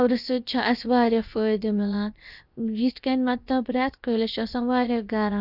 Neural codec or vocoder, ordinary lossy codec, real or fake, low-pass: codec, 16 kHz, 0.7 kbps, FocalCodec; Opus, 64 kbps; fake; 5.4 kHz